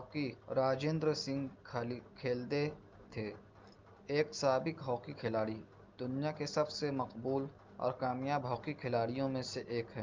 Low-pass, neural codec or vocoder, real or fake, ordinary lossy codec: 7.2 kHz; none; real; Opus, 24 kbps